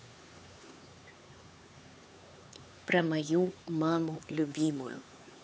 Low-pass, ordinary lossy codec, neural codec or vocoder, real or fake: none; none; codec, 16 kHz, 4 kbps, X-Codec, HuBERT features, trained on LibriSpeech; fake